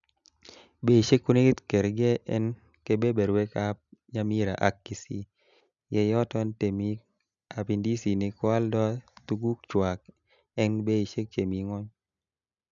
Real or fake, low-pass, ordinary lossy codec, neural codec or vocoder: real; 7.2 kHz; MP3, 96 kbps; none